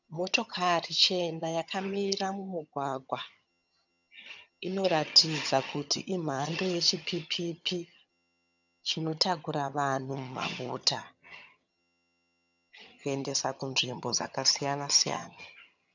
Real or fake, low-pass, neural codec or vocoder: fake; 7.2 kHz; vocoder, 22.05 kHz, 80 mel bands, HiFi-GAN